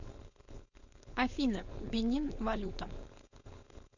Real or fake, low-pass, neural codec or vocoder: fake; 7.2 kHz; codec, 16 kHz, 4.8 kbps, FACodec